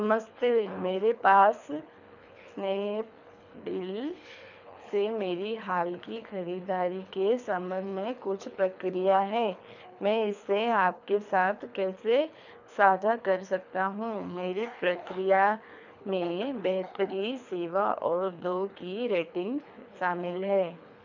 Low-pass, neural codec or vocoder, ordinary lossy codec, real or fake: 7.2 kHz; codec, 24 kHz, 3 kbps, HILCodec; none; fake